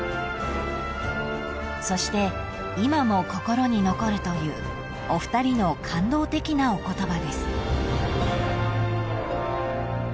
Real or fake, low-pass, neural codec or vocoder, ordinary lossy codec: real; none; none; none